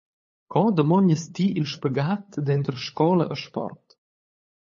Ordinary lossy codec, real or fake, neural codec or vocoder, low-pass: MP3, 32 kbps; fake; codec, 16 kHz, 8 kbps, FunCodec, trained on LibriTTS, 25 frames a second; 7.2 kHz